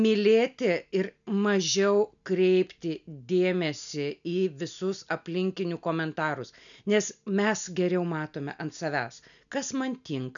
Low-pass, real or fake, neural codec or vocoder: 7.2 kHz; real; none